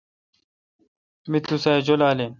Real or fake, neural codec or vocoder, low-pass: real; none; 7.2 kHz